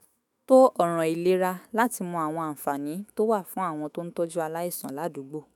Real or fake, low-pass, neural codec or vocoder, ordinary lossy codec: fake; 19.8 kHz; autoencoder, 48 kHz, 128 numbers a frame, DAC-VAE, trained on Japanese speech; none